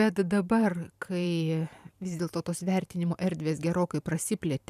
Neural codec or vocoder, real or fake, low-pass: vocoder, 44.1 kHz, 128 mel bands every 512 samples, BigVGAN v2; fake; 14.4 kHz